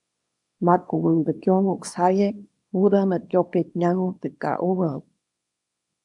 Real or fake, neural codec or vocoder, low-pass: fake; codec, 24 kHz, 0.9 kbps, WavTokenizer, small release; 10.8 kHz